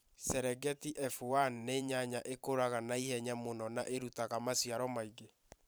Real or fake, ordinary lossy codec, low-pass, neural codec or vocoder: fake; none; none; vocoder, 44.1 kHz, 128 mel bands every 512 samples, BigVGAN v2